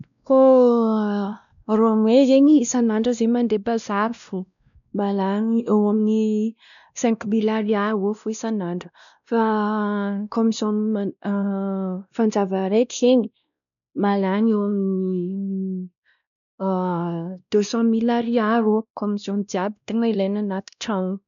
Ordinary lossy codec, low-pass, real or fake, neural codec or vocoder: none; 7.2 kHz; fake; codec, 16 kHz, 1 kbps, X-Codec, WavLM features, trained on Multilingual LibriSpeech